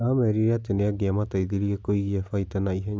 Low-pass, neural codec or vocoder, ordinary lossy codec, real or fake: none; none; none; real